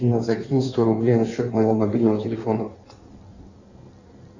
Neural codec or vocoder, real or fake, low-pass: codec, 16 kHz in and 24 kHz out, 1.1 kbps, FireRedTTS-2 codec; fake; 7.2 kHz